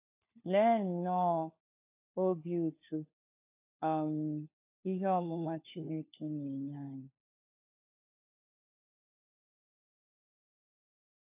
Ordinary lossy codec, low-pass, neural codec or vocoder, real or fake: AAC, 32 kbps; 3.6 kHz; codec, 16 kHz, 4 kbps, FunCodec, trained on LibriTTS, 50 frames a second; fake